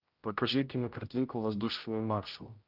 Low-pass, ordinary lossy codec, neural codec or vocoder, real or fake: 5.4 kHz; Opus, 32 kbps; codec, 16 kHz, 0.5 kbps, X-Codec, HuBERT features, trained on general audio; fake